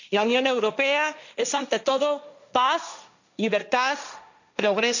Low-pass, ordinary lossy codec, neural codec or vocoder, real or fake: 7.2 kHz; none; codec, 16 kHz, 1.1 kbps, Voila-Tokenizer; fake